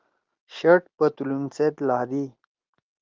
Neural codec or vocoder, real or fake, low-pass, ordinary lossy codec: none; real; 7.2 kHz; Opus, 24 kbps